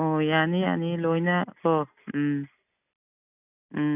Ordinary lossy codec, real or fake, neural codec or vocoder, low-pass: none; real; none; 3.6 kHz